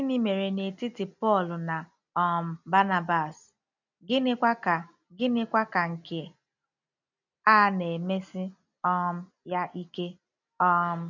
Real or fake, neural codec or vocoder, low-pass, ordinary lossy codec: real; none; 7.2 kHz; none